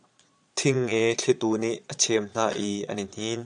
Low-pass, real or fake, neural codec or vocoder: 9.9 kHz; fake; vocoder, 22.05 kHz, 80 mel bands, Vocos